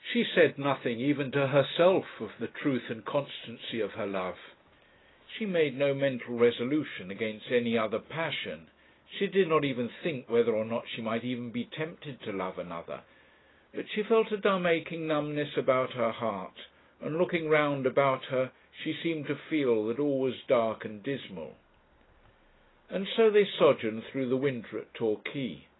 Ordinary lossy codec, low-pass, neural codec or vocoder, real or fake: AAC, 16 kbps; 7.2 kHz; none; real